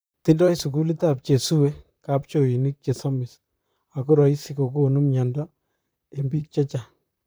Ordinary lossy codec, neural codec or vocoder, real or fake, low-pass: none; vocoder, 44.1 kHz, 128 mel bands, Pupu-Vocoder; fake; none